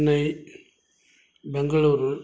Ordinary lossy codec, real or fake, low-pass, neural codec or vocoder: none; real; none; none